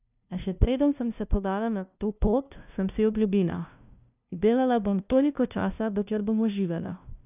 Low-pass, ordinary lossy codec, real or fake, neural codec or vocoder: 3.6 kHz; none; fake; codec, 16 kHz, 0.5 kbps, FunCodec, trained on LibriTTS, 25 frames a second